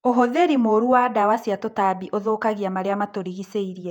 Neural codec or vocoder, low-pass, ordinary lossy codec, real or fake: vocoder, 48 kHz, 128 mel bands, Vocos; 19.8 kHz; none; fake